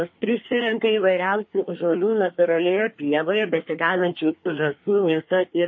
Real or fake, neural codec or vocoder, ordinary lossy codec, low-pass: fake; codec, 24 kHz, 1 kbps, SNAC; MP3, 32 kbps; 7.2 kHz